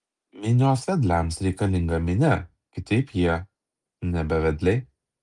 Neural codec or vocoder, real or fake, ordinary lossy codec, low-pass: none; real; Opus, 32 kbps; 10.8 kHz